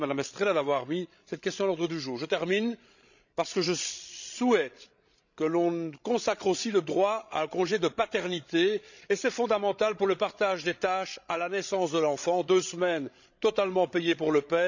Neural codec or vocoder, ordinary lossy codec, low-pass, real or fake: codec, 16 kHz, 16 kbps, FreqCodec, larger model; none; 7.2 kHz; fake